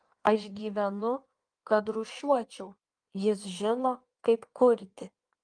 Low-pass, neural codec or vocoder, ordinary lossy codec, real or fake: 9.9 kHz; codec, 16 kHz in and 24 kHz out, 1.1 kbps, FireRedTTS-2 codec; Opus, 32 kbps; fake